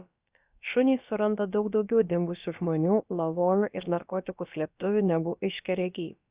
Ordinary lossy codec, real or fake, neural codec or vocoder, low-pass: Opus, 64 kbps; fake; codec, 16 kHz, about 1 kbps, DyCAST, with the encoder's durations; 3.6 kHz